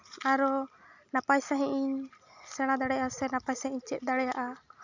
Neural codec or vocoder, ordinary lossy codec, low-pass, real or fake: none; none; 7.2 kHz; real